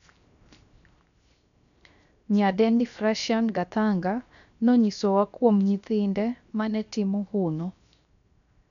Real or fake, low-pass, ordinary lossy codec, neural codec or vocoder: fake; 7.2 kHz; none; codec, 16 kHz, 0.7 kbps, FocalCodec